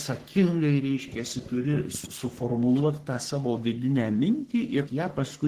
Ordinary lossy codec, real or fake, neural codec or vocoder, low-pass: Opus, 16 kbps; fake; codec, 44.1 kHz, 3.4 kbps, Pupu-Codec; 14.4 kHz